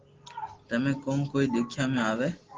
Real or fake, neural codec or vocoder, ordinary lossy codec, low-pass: real; none; Opus, 16 kbps; 7.2 kHz